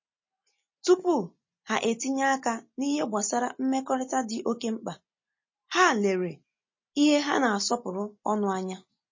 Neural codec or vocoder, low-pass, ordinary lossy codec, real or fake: none; 7.2 kHz; MP3, 32 kbps; real